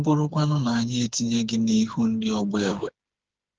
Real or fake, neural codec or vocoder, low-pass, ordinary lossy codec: fake; codec, 16 kHz, 4 kbps, FreqCodec, smaller model; 7.2 kHz; Opus, 16 kbps